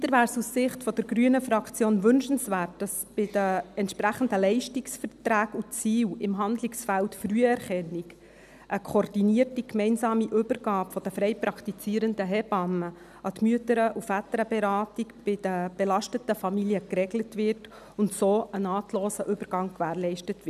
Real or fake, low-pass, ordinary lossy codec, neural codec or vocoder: real; 14.4 kHz; none; none